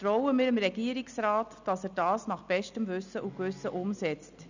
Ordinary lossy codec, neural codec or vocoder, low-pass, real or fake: none; none; 7.2 kHz; real